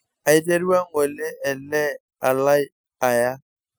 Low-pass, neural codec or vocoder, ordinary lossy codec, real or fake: none; none; none; real